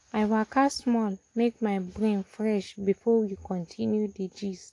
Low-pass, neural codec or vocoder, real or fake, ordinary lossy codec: 10.8 kHz; none; real; AAC, 48 kbps